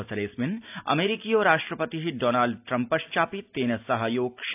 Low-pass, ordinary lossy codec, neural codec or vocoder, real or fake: 3.6 kHz; AAC, 32 kbps; none; real